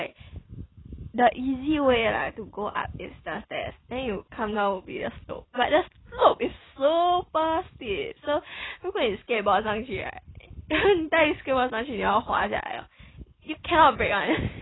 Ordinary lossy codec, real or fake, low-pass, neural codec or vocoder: AAC, 16 kbps; real; 7.2 kHz; none